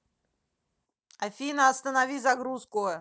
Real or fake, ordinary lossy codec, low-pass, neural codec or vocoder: real; none; none; none